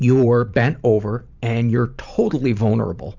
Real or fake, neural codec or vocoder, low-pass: fake; vocoder, 44.1 kHz, 128 mel bands every 512 samples, BigVGAN v2; 7.2 kHz